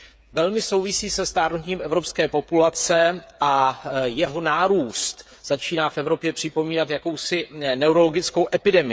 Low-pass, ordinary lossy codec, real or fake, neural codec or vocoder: none; none; fake; codec, 16 kHz, 8 kbps, FreqCodec, smaller model